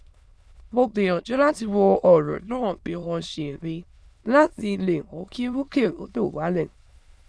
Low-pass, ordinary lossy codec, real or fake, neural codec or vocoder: none; none; fake; autoencoder, 22.05 kHz, a latent of 192 numbers a frame, VITS, trained on many speakers